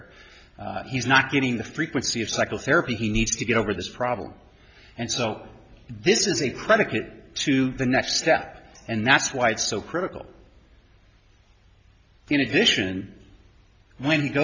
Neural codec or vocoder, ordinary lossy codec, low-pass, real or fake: none; MP3, 48 kbps; 7.2 kHz; real